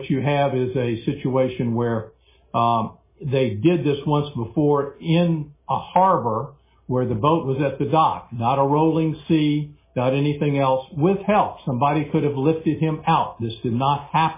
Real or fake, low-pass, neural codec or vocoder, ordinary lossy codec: real; 3.6 kHz; none; MP3, 16 kbps